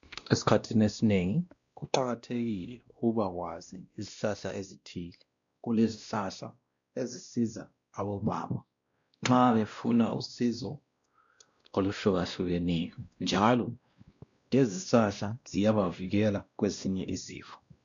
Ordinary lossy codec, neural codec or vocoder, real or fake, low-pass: MP3, 64 kbps; codec, 16 kHz, 1 kbps, X-Codec, WavLM features, trained on Multilingual LibriSpeech; fake; 7.2 kHz